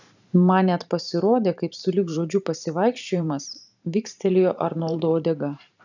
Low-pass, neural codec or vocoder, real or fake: 7.2 kHz; vocoder, 44.1 kHz, 128 mel bands every 512 samples, BigVGAN v2; fake